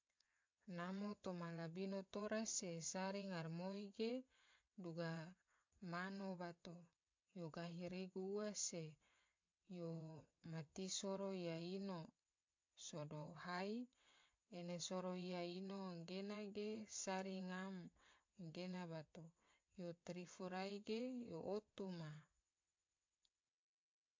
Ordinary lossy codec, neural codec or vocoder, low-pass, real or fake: MP3, 48 kbps; vocoder, 22.05 kHz, 80 mel bands, WaveNeXt; 7.2 kHz; fake